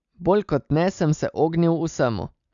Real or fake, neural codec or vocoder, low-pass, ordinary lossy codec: real; none; 7.2 kHz; none